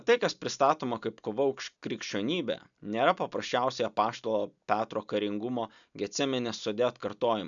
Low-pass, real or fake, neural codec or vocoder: 7.2 kHz; real; none